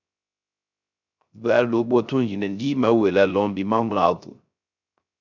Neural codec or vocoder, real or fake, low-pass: codec, 16 kHz, 0.3 kbps, FocalCodec; fake; 7.2 kHz